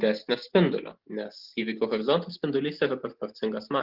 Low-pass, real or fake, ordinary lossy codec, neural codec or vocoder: 5.4 kHz; real; Opus, 32 kbps; none